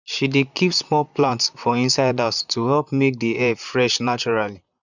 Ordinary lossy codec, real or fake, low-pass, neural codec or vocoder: none; fake; 7.2 kHz; vocoder, 44.1 kHz, 128 mel bands, Pupu-Vocoder